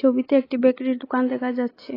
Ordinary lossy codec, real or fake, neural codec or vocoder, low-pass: AAC, 24 kbps; real; none; 5.4 kHz